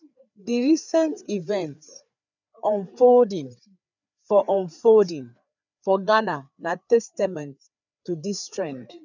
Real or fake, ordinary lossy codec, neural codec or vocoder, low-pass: fake; none; codec, 16 kHz, 4 kbps, FreqCodec, larger model; 7.2 kHz